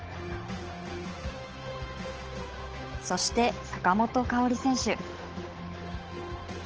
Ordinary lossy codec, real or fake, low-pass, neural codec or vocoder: Opus, 16 kbps; fake; 7.2 kHz; codec, 16 kHz, 8 kbps, FunCodec, trained on Chinese and English, 25 frames a second